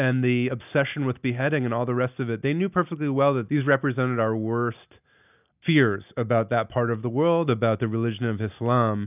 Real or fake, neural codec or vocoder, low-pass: real; none; 3.6 kHz